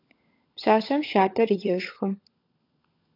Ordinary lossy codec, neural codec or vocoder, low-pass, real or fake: AAC, 32 kbps; codec, 16 kHz, 16 kbps, FunCodec, trained on LibriTTS, 50 frames a second; 5.4 kHz; fake